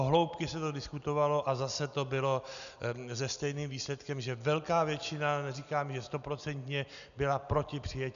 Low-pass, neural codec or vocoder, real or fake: 7.2 kHz; none; real